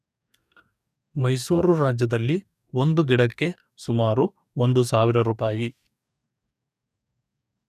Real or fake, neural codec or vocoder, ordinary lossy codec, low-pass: fake; codec, 44.1 kHz, 2.6 kbps, DAC; none; 14.4 kHz